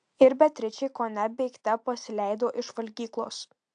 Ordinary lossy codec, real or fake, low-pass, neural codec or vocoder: AAC, 64 kbps; real; 9.9 kHz; none